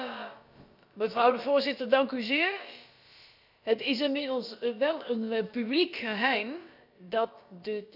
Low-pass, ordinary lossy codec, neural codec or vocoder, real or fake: 5.4 kHz; none; codec, 16 kHz, about 1 kbps, DyCAST, with the encoder's durations; fake